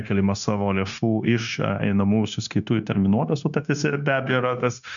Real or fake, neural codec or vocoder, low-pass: fake; codec, 16 kHz, 0.9 kbps, LongCat-Audio-Codec; 7.2 kHz